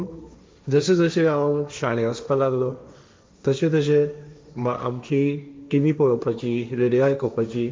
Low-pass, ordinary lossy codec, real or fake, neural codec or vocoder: none; none; fake; codec, 16 kHz, 1.1 kbps, Voila-Tokenizer